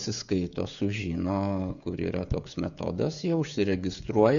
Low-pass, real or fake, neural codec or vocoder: 7.2 kHz; fake; codec, 16 kHz, 16 kbps, FreqCodec, smaller model